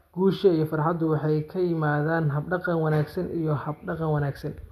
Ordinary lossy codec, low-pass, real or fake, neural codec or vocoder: none; 14.4 kHz; real; none